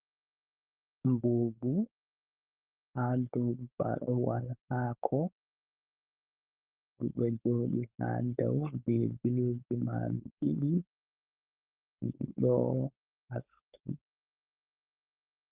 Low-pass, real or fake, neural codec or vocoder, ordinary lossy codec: 3.6 kHz; fake; codec, 16 kHz, 4.8 kbps, FACodec; Opus, 32 kbps